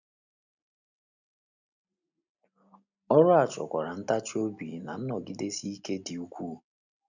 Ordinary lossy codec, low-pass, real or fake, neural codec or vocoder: none; 7.2 kHz; real; none